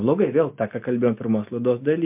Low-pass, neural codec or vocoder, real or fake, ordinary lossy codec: 3.6 kHz; none; real; MP3, 32 kbps